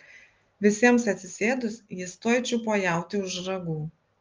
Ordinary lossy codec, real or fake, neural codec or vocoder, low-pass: Opus, 32 kbps; real; none; 7.2 kHz